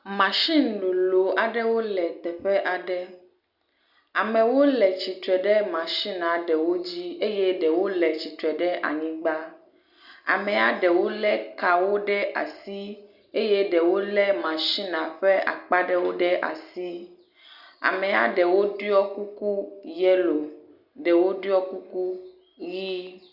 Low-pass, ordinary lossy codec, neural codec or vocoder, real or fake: 5.4 kHz; Opus, 64 kbps; none; real